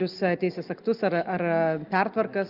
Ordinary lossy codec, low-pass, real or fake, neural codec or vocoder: Opus, 24 kbps; 5.4 kHz; real; none